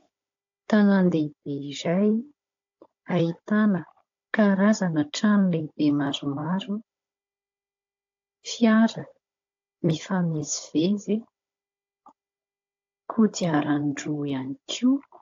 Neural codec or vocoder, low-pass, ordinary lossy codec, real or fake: codec, 16 kHz, 16 kbps, FunCodec, trained on Chinese and English, 50 frames a second; 7.2 kHz; AAC, 48 kbps; fake